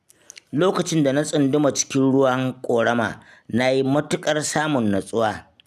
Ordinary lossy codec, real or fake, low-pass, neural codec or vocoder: none; real; 14.4 kHz; none